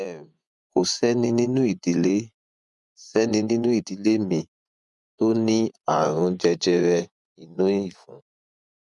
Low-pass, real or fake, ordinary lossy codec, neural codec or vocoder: 10.8 kHz; fake; none; vocoder, 24 kHz, 100 mel bands, Vocos